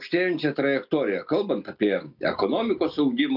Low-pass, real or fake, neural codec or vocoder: 5.4 kHz; real; none